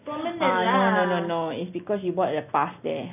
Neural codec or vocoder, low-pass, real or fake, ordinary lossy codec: none; 3.6 kHz; real; none